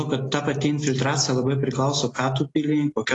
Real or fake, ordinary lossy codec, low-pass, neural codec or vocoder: real; AAC, 32 kbps; 10.8 kHz; none